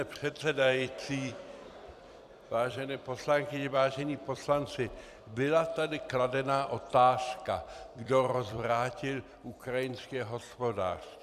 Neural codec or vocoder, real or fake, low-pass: vocoder, 44.1 kHz, 128 mel bands every 256 samples, BigVGAN v2; fake; 14.4 kHz